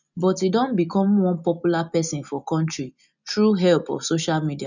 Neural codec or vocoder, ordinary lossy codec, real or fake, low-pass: none; none; real; 7.2 kHz